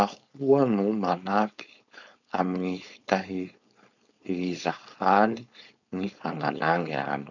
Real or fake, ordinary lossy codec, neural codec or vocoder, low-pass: fake; none; codec, 16 kHz, 4.8 kbps, FACodec; 7.2 kHz